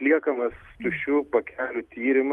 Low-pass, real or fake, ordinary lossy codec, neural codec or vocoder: 14.4 kHz; real; Opus, 32 kbps; none